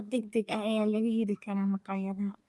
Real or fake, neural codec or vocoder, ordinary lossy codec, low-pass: fake; codec, 24 kHz, 1 kbps, SNAC; none; none